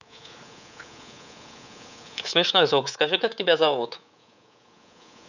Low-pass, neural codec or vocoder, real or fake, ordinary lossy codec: 7.2 kHz; codec, 24 kHz, 3.1 kbps, DualCodec; fake; none